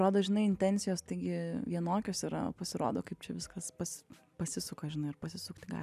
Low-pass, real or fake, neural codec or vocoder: 14.4 kHz; real; none